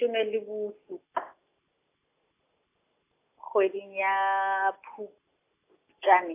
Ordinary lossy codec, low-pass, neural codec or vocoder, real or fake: AAC, 24 kbps; 3.6 kHz; none; real